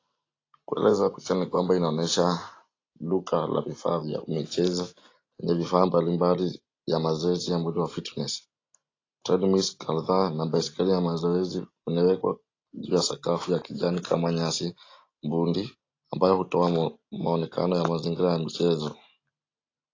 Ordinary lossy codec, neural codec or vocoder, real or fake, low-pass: AAC, 32 kbps; none; real; 7.2 kHz